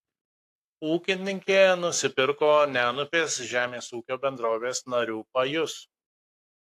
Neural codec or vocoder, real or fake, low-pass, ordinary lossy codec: codec, 44.1 kHz, 7.8 kbps, Pupu-Codec; fake; 14.4 kHz; AAC, 64 kbps